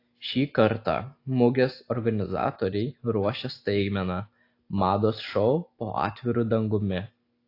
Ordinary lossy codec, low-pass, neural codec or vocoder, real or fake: AAC, 32 kbps; 5.4 kHz; none; real